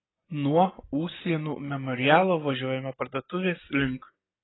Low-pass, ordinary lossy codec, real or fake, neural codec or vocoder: 7.2 kHz; AAC, 16 kbps; real; none